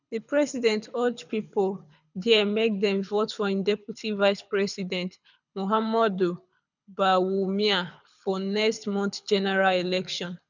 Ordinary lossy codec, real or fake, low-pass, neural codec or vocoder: none; fake; 7.2 kHz; codec, 24 kHz, 6 kbps, HILCodec